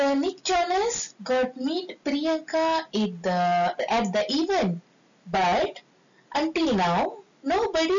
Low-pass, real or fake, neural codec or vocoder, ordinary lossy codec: 7.2 kHz; real; none; none